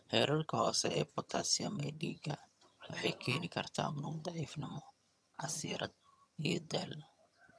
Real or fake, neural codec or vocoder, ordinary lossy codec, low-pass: fake; vocoder, 22.05 kHz, 80 mel bands, HiFi-GAN; none; none